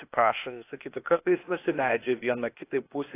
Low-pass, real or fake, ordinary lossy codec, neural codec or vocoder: 3.6 kHz; fake; AAC, 24 kbps; codec, 16 kHz, 0.8 kbps, ZipCodec